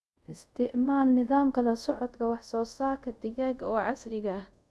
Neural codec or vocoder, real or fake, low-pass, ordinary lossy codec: codec, 24 kHz, 0.5 kbps, DualCodec; fake; none; none